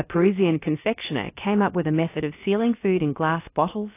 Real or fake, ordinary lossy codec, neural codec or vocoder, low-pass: fake; AAC, 24 kbps; codec, 16 kHz, about 1 kbps, DyCAST, with the encoder's durations; 3.6 kHz